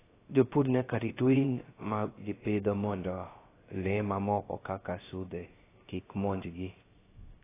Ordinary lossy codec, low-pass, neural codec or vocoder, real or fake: AAC, 16 kbps; 3.6 kHz; codec, 16 kHz, 0.3 kbps, FocalCodec; fake